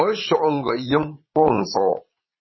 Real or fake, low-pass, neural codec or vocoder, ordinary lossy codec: fake; 7.2 kHz; vocoder, 22.05 kHz, 80 mel bands, Vocos; MP3, 24 kbps